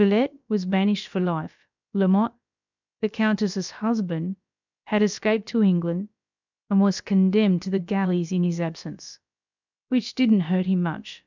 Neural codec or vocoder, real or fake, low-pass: codec, 16 kHz, about 1 kbps, DyCAST, with the encoder's durations; fake; 7.2 kHz